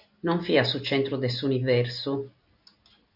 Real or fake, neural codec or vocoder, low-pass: real; none; 5.4 kHz